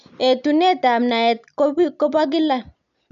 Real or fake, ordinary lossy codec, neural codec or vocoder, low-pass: real; none; none; 7.2 kHz